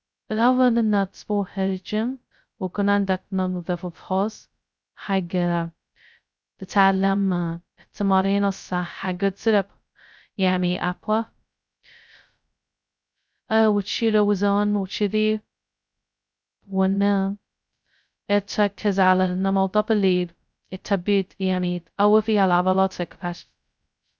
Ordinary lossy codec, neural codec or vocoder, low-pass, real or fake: none; codec, 16 kHz, 0.2 kbps, FocalCodec; none; fake